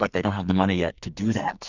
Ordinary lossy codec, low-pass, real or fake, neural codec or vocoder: Opus, 64 kbps; 7.2 kHz; fake; codec, 44.1 kHz, 2.6 kbps, SNAC